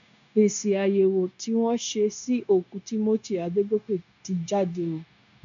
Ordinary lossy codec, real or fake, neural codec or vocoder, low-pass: none; fake; codec, 16 kHz, 0.9 kbps, LongCat-Audio-Codec; 7.2 kHz